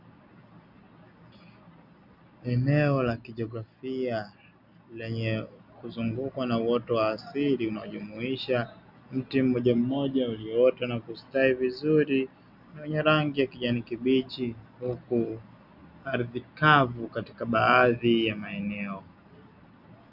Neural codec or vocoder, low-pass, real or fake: none; 5.4 kHz; real